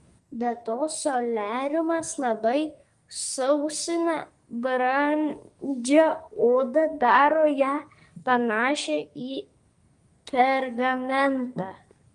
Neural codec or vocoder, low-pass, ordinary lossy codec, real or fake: codec, 44.1 kHz, 2.6 kbps, SNAC; 10.8 kHz; Opus, 32 kbps; fake